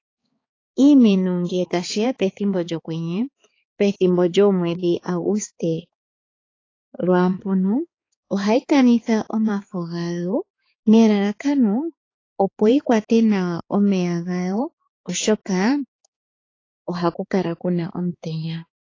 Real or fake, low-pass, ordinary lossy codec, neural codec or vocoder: fake; 7.2 kHz; AAC, 32 kbps; codec, 16 kHz, 4 kbps, X-Codec, HuBERT features, trained on balanced general audio